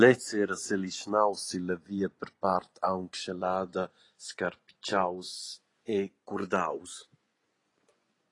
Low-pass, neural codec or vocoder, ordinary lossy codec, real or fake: 10.8 kHz; vocoder, 24 kHz, 100 mel bands, Vocos; AAC, 32 kbps; fake